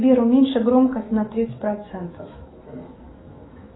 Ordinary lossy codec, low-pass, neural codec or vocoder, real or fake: AAC, 16 kbps; 7.2 kHz; autoencoder, 48 kHz, 128 numbers a frame, DAC-VAE, trained on Japanese speech; fake